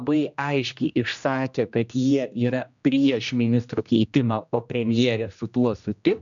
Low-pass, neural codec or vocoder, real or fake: 7.2 kHz; codec, 16 kHz, 1 kbps, X-Codec, HuBERT features, trained on general audio; fake